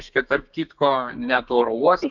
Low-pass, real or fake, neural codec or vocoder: 7.2 kHz; fake; codec, 32 kHz, 1.9 kbps, SNAC